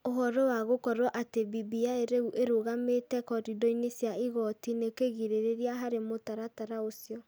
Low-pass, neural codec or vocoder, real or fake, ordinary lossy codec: none; none; real; none